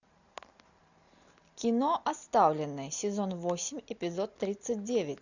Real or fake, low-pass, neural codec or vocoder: real; 7.2 kHz; none